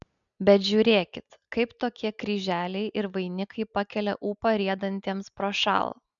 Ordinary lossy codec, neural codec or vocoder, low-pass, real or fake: MP3, 96 kbps; none; 7.2 kHz; real